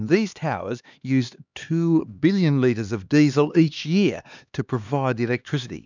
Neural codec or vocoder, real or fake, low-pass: codec, 16 kHz, 2 kbps, X-Codec, HuBERT features, trained on LibriSpeech; fake; 7.2 kHz